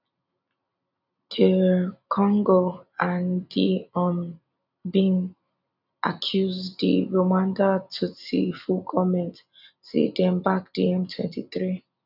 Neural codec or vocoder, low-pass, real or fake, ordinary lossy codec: none; 5.4 kHz; real; none